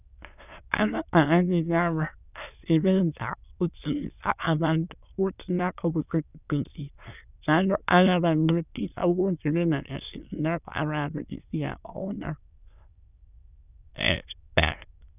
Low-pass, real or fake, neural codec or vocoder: 3.6 kHz; fake; autoencoder, 22.05 kHz, a latent of 192 numbers a frame, VITS, trained on many speakers